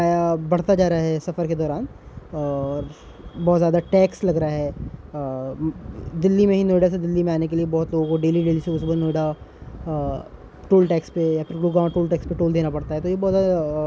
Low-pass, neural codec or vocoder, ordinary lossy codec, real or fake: none; none; none; real